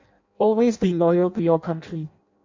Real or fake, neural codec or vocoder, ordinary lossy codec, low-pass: fake; codec, 16 kHz in and 24 kHz out, 0.6 kbps, FireRedTTS-2 codec; MP3, 48 kbps; 7.2 kHz